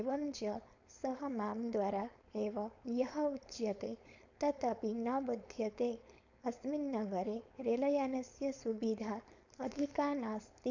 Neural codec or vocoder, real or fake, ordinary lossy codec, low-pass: codec, 16 kHz, 4.8 kbps, FACodec; fake; none; 7.2 kHz